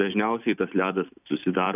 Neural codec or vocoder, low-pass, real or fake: vocoder, 44.1 kHz, 128 mel bands every 256 samples, BigVGAN v2; 3.6 kHz; fake